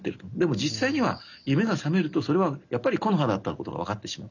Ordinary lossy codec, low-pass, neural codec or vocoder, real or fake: none; 7.2 kHz; none; real